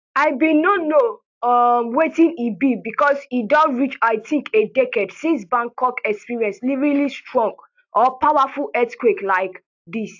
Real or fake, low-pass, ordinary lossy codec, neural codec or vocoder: real; 7.2 kHz; AAC, 48 kbps; none